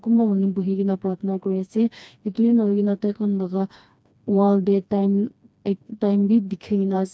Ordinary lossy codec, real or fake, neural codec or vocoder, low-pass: none; fake; codec, 16 kHz, 2 kbps, FreqCodec, smaller model; none